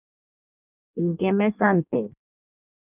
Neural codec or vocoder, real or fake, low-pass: codec, 16 kHz in and 24 kHz out, 0.6 kbps, FireRedTTS-2 codec; fake; 3.6 kHz